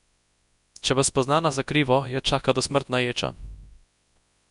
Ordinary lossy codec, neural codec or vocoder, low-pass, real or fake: none; codec, 24 kHz, 0.9 kbps, WavTokenizer, large speech release; 10.8 kHz; fake